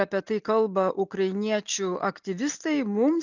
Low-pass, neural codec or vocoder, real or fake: 7.2 kHz; none; real